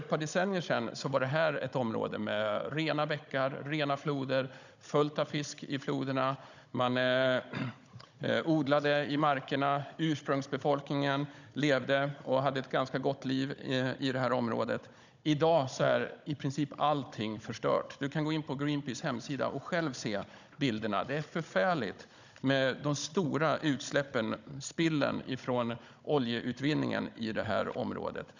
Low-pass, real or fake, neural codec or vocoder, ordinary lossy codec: 7.2 kHz; fake; codec, 16 kHz, 16 kbps, FunCodec, trained on Chinese and English, 50 frames a second; none